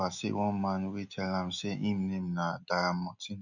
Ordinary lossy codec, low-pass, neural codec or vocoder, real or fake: none; 7.2 kHz; none; real